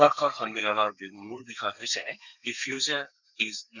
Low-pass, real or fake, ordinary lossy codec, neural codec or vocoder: 7.2 kHz; fake; none; codec, 32 kHz, 1.9 kbps, SNAC